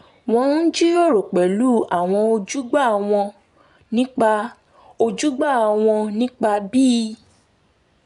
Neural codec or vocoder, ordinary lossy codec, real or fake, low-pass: vocoder, 24 kHz, 100 mel bands, Vocos; none; fake; 10.8 kHz